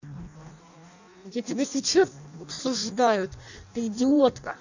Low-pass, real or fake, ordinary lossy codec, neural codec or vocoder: 7.2 kHz; fake; none; codec, 16 kHz in and 24 kHz out, 0.6 kbps, FireRedTTS-2 codec